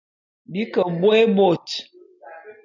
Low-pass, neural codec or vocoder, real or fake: 7.2 kHz; none; real